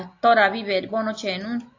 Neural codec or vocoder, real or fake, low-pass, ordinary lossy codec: none; real; 7.2 kHz; AAC, 48 kbps